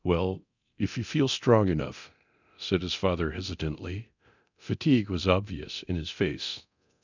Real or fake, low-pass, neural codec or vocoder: fake; 7.2 kHz; codec, 24 kHz, 0.9 kbps, DualCodec